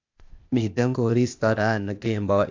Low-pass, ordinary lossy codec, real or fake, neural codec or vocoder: 7.2 kHz; AAC, 48 kbps; fake; codec, 16 kHz, 0.8 kbps, ZipCodec